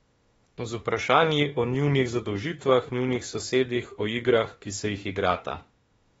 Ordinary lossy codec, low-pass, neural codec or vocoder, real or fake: AAC, 24 kbps; 19.8 kHz; autoencoder, 48 kHz, 32 numbers a frame, DAC-VAE, trained on Japanese speech; fake